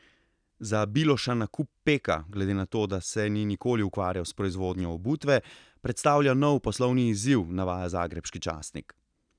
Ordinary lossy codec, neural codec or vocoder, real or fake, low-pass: none; none; real; 9.9 kHz